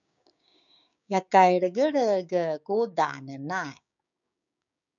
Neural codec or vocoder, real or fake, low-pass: codec, 16 kHz, 6 kbps, DAC; fake; 7.2 kHz